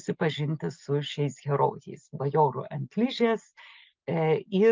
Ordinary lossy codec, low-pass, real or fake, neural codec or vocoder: Opus, 32 kbps; 7.2 kHz; real; none